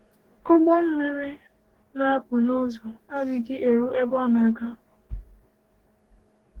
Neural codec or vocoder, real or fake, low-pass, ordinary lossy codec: codec, 44.1 kHz, 2.6 kbps, DAC; fake; 19.8 kHz; Opus, 16 kbps